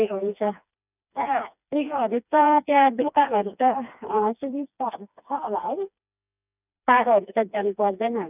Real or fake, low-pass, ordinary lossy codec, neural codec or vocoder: fake; 3.6 kHz; none; codec, 16 kHz, 2 kbps, FreqCodec, smaller model